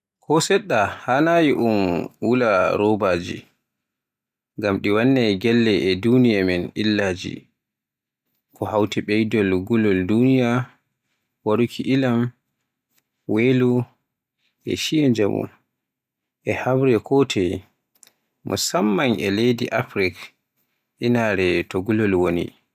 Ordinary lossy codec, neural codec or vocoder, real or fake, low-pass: none; none; real; 14.4 kHz